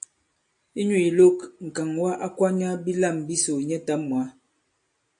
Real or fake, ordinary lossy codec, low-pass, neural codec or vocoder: real; AAC, 64 kbps; 9.9 kHz; none